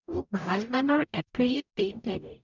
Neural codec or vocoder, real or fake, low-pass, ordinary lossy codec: codec, 44.1 kHz, 0.9 kbps, DAC; fake; 7.2 kHz; none